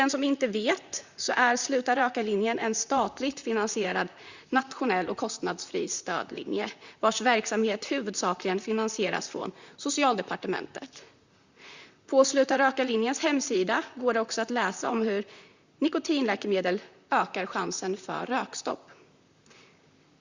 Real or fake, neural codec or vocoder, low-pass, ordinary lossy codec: fake; vocoder, 44.1 kHz, 128 mel bands, Pupu-Vocoder; 7.2 kHz; Opus, 64 kbps